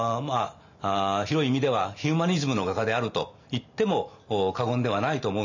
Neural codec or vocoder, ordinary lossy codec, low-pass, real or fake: none; none; 7.2 kHz; real